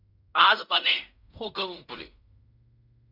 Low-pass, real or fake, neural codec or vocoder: 5.4 kHz; fake; codec, 16 kHz in and 24 kHz out, 0.4 kbps, LongCat-Audio-Codec, fine tuned four codebook decoder